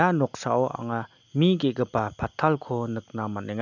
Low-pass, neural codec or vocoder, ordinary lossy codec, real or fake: 7.2 kHz; none; none; real